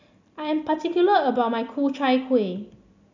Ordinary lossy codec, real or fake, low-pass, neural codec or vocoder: none; real; 7.2 kHz; none